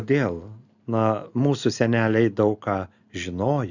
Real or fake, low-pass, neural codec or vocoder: real; 7.2 kHz; none